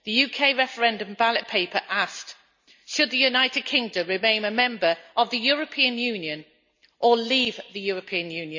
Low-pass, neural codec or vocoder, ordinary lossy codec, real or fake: 7.2 kHz; none; MP3, 64 kbps; real